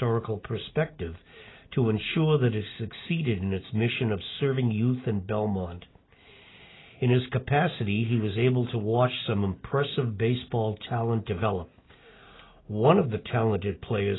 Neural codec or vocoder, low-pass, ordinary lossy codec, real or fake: none; 7.2 kHz; AAC, 16 kbps; real